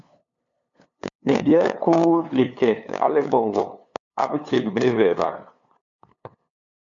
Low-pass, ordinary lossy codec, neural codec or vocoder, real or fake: 7.2 kHz; MP3, 64 kbps; codec, 16 kHz, 2 kbps, FunCodec, trained on LibriTTS, 25 frames a second; fake